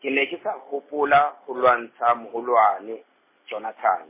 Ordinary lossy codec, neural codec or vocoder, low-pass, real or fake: MP3, 16 kbps; none; 3.6 kHz; real